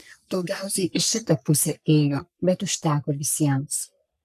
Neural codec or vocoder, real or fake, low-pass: codec, 44.1 kHz, 3.4 kbps, Pupu-Codec; fake; 14.4 kHz